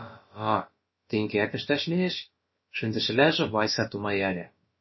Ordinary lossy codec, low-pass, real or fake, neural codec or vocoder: MP3, 24 kbps; 7.2 kHz; fake; codec, 16 kHz, about 1 kbps, DyCAST, with the encoder's durations